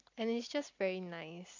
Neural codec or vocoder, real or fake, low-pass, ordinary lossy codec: none; real; 7.2 kHz; AAC, 48 kbps